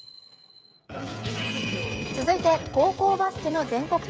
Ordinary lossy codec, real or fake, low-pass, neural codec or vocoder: none; fake; none; codec, 16 kHz, 16 kbps, FreqCodec, smaller model